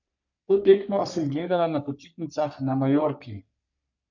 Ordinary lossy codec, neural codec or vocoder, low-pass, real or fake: none; codec, 44.1 kHz, 3.4 kbps, Pupu-Codec; 7.2 kHz; fake